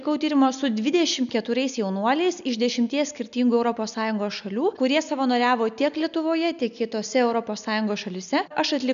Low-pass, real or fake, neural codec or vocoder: 7.2 kHz; real; none